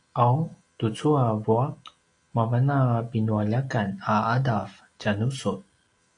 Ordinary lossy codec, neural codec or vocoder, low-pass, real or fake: AAC, 48 kbps; none; 9.9 kHz; real